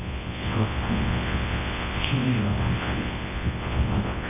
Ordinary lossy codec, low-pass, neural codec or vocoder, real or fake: AAC, 16 kbps; 3.6 kHz; codec, 24 kHz, 0.9 kbps, WavTokenizer, large speech release; fake